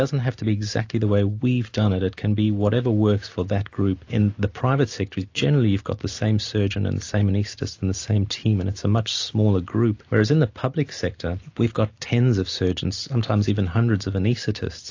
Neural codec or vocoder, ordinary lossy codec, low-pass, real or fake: none; AAC, 48 kbps; 7.2 kHz; real